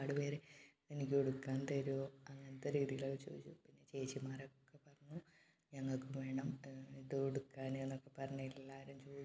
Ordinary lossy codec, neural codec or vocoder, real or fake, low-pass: none; none; real; none